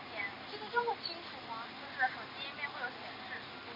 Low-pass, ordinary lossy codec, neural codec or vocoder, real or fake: 5.4 kHz; MP3, 48 kbps; none; real